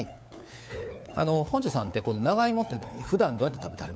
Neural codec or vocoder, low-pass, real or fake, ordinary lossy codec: codec, 16 kHz, 4 kbps, FunCodec, trained on LibriTTS, 50 frames a second; none; fake; none